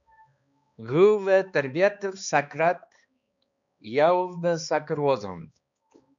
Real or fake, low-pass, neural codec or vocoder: fake; 7.2 kHz; codec, 16 kHz, 2 kbps, X-Codec, HuBERT features, trained on balanced general audio